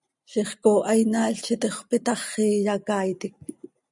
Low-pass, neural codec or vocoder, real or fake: 10.8 kHz; none; real